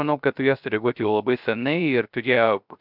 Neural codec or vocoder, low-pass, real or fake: codec, 16 kHz, 0.3 kbps, FocalCodec; 5.4 kHz; fake